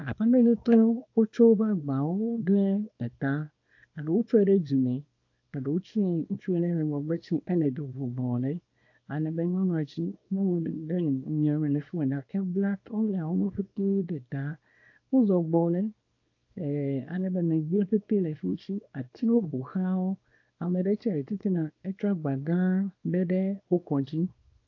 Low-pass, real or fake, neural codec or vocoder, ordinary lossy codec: 7.2 kHz; fake; codec, 24 kHz, 0.9 kbps, WavTokenizer, small release; AAC, 48 kbps